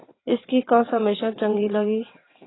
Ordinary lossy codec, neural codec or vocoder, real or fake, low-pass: AAC, 16 kbps; vocoder, 22.05 kHz, 80 mel bands, WaveNeXt; fake; 7.2 kHz